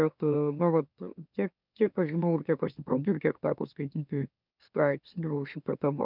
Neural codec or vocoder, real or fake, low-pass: autoencoder, 44.1 kHz, a latent of 192 numbers a frame, MeloTTS; fake; 5.4 kHz